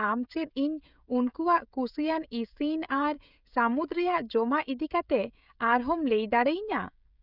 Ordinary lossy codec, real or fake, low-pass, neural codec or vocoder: none; fake; 5.4 kHz; codec, 16 kHz, 16 kbps, FreqCodec, smaller model